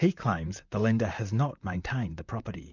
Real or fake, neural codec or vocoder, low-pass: fake; vocoder, 44.1 kHz, 128 mel bands, Pupu-Vocoder; 7.2 kHz